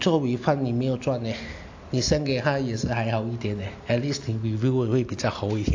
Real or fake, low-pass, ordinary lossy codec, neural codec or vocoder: real; 7.2 kHz; AAC, 48 kbps; none